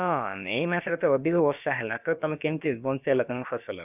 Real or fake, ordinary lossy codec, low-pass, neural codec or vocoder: fake; none; 3.6 kHz; codec, 16 kHz, about 1 kbps, DyCAST, with the encoder's durations